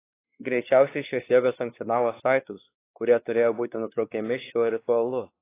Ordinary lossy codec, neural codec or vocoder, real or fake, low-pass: AAC, 24 kbps; codec, 16 kHz, 2 kbps, X-Codec, WavLM features, trained on Multilingual LibriSpeech; fake; 3.6 kHz